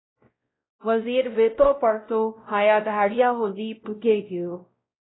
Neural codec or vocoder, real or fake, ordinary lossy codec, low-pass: codec, 16 kHz, 0.5 kbps, X-Codec, WavLM features, trained on Multilingual LibriSpeech; fake; AAC, 16 kbps; 7.2 kHz